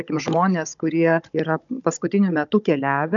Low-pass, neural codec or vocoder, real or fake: 7.2 kHz; codec, 16 kHz, 16 kbps, FunCodec, trained on Chinese and English, 50 frames a second; fake